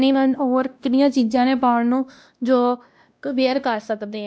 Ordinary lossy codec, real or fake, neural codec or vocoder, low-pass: none; fake; codec, 16 kHz, 1 kbps, X-Codec, WavLM features, trained on Multilingual LibriSpeech; none